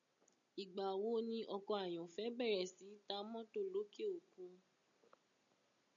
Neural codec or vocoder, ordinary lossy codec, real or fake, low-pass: none; MP3, 96 kbps; real; 7.2 kHz